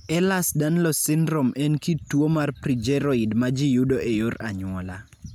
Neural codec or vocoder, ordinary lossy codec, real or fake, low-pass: none; none; real; 19.8 kHz